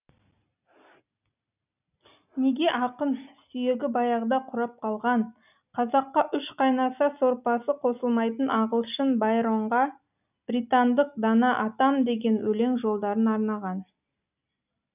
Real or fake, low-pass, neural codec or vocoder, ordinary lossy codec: real; 3.6 kHz; none; none